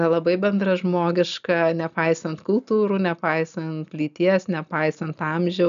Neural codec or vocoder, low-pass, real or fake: none; 7.2 kHz; real